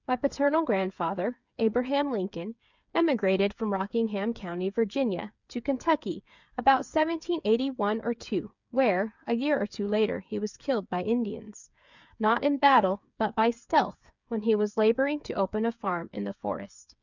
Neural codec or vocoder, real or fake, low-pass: codec, 16 kHz, 8 kbps, FreqCodec, smaller model; fake; 7.2 kHz